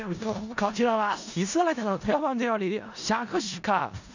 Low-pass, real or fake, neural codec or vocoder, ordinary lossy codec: 7.2 kHz; fake; codec, 16 kHz in and 24 kHz out, 0.4 kbps, LongCat-Audio-Codec, four codebook decoder; none